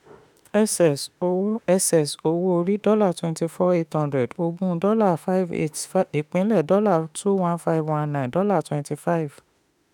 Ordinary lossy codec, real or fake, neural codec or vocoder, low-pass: none; fake; autoencoder, 48 kHz, 32 numbers a frame, DAC-VAE, trained on Japanese speech; none